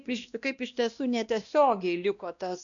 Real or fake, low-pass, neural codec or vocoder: fake; 7.2 kHz; codec, 16 kHz, 1 kbps, X-Codec, WavLM features, trained on Multilingual LibriSpeech